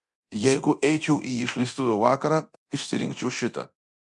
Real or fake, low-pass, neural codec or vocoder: fake; 10.8 kHz; codec, 24 kHz, 0.9 kbps, DualCodec